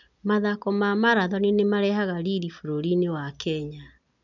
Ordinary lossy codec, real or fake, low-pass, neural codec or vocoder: none; real; 7.2 kHz; none